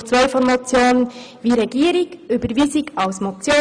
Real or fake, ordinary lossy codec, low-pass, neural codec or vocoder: real; none; 9.9 kHz; none